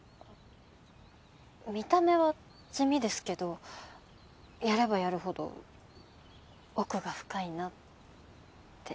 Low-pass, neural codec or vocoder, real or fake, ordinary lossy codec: none; none; real; none